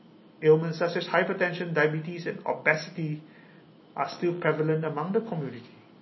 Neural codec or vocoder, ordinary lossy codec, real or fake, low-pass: none; MP3, 24 kbps; real; 7.2 kHz